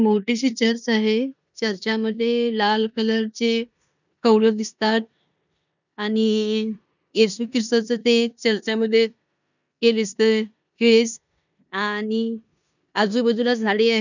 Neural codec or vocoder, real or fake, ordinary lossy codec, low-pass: codec, 16 kHz in and 24 kHz out, 0.9 kbps, LongCat-Audio-Codec, four codebook decoder; fake; none; 7.2 kHz